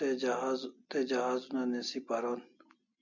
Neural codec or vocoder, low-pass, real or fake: none; 7.2 kHz; real